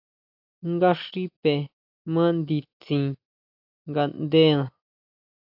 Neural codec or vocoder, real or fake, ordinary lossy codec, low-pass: none; real; AAC, 48 kbps; 5.4 kHz